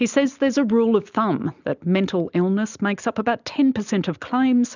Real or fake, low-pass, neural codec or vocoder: real; 7.2 kHz; none